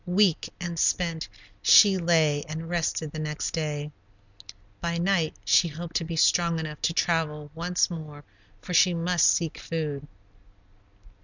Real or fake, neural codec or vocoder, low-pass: real; none; 7.2 kHz